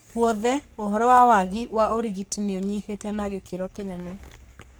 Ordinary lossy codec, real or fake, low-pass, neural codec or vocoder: none; fake; none; codec, 44.1 kHz, 3.4 kbps, Pupu-Codec